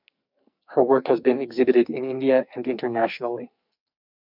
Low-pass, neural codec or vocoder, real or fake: 5.4 kHz; codec, 44.1 kHz, 2.6 kbps, SNAC; fake